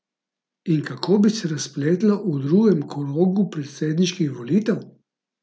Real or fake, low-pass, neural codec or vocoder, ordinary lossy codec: real; none; none; none